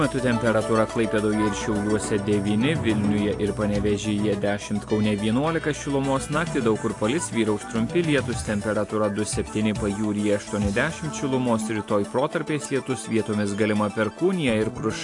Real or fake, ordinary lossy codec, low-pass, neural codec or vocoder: real; AAC, 48 kbps; 10.8 kHz; none